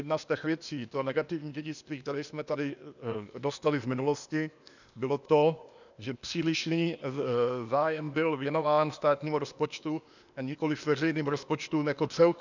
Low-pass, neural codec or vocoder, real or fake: 7.2 kHz; codec, 16 kHz, 0.8 kbps, ZipCodec; fake